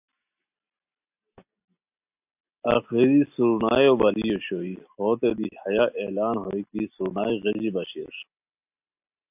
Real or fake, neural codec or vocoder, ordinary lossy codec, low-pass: real; none; AAC, 32 kbps; 3.6 kHz